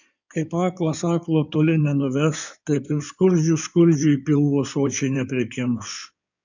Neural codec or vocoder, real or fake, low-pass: codec, 16 kHz in and 24 kHz out, 2.2 kbps, FireRedTTS-2 codec; fake; 7.2 kHz